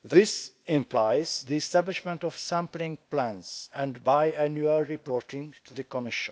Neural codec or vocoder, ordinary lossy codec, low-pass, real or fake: codec, 16 kHz, 0.8 kbps, ZipCodec; none; none; fake